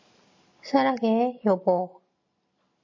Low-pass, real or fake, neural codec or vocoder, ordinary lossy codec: 7.2 kHz; real; none; MP3, 32 kbps